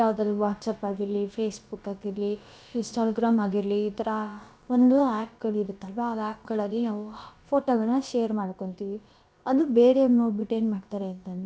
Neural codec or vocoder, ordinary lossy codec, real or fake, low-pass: codec, 16 kHz, about 1 kbps, DyCAST, with the encoder's durations; none; fake; none